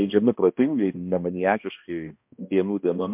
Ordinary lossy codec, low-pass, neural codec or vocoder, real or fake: MP3, 32 kbps; 3.6 kHz; codec, 16 kHz, 1 kbps, X-Codec, HuBERT features, trained on balanced general audio; fake